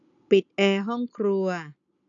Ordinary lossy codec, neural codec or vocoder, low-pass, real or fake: none; none; 7.2 kHz; real